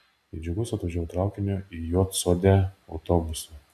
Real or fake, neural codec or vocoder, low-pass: real; none; 14.4 kHz